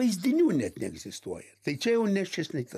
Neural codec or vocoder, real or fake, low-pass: none; real; 14.4 kHz